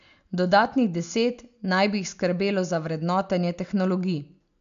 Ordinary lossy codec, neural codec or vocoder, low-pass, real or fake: MP3, 64 kbps; none; 7.2 kHz; real